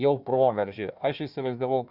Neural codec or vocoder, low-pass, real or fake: codec, 16 kHz, 1 kbps, FunCodec, trained on Chinese and English, 50 frames a second; 5.4 kHz; fake